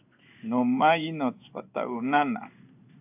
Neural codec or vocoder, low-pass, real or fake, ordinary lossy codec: codec, 16 kHz in and 24 kHz out, 1 kbps, XY-Tokenizer; 3.6 kHz; fake; AAC, 32 kbps